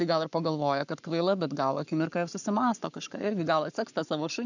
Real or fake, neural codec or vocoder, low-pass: fake; codec, 44.1 kHz, 7.8 kbps, Pupu-Codec; 7.2 kHz